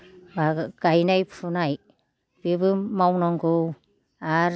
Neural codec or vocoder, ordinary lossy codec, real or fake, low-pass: none; none; real; none